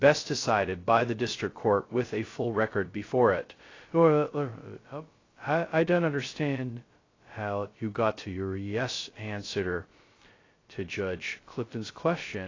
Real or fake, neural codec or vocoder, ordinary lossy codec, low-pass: fake; codec, 16 kHz, 0.2 kbps, FocalCodec; AAC, 32 kbps; 7.2 kHz